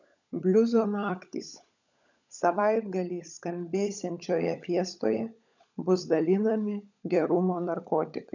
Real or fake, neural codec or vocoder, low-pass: fake; codec, 16 kHz, 16 kbps, FunCodec, trained on LibriTTS, 50 frames a second; 7.2 kHz